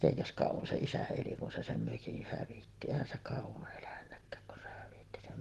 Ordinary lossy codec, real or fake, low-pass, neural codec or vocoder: Opus, 16 kbps; real; 14.4 kHz; none